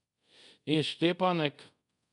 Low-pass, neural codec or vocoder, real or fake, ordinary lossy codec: 10.8 kHz; codec, 24 kHz, 0.5 kbps, DualCodec; fake; none